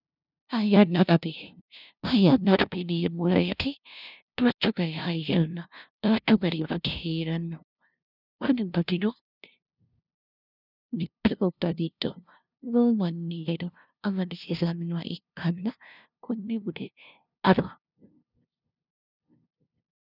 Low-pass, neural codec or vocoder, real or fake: 5.4 kHz; codec, 16 kHz, 0.5 kbps, FunCodec, trained on LibriTTS, 25 frames a second; fake